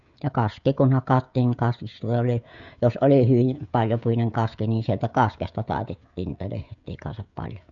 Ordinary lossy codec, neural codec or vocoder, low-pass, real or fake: none; codec, 16 kHz, 16 kbps, FreqCodec, smaller model; 7.2 kHz; fake